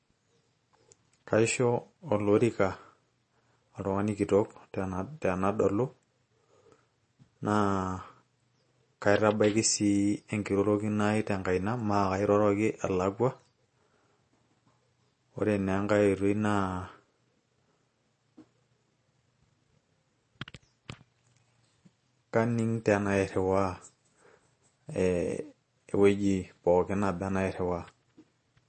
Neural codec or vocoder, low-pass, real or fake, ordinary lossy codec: none; 10.8 kHz; real; MP3, 32 kbps